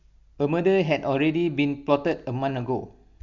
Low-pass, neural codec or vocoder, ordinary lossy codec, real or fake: 7.2 kHz; none; none; real